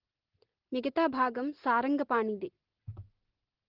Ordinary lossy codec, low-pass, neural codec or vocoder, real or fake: Opus, 16 kbps; 5.4 kHz; none; real